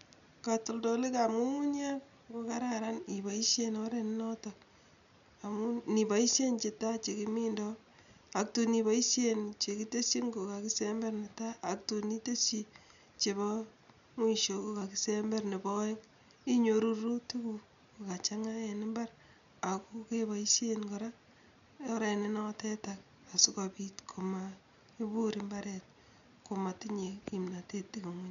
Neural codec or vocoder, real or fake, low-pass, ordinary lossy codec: none; real; 7.2 kHz; none